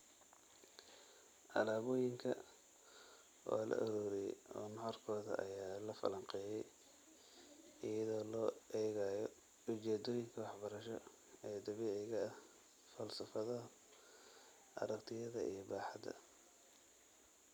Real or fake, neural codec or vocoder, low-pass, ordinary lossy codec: real; none; none; none